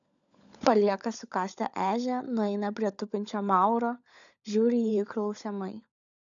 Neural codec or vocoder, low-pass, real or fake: codec, 16 kHz, 16 kbps, FunCodec, trained on LibriTTS, 50 frames a second; 7.2 kHz; fake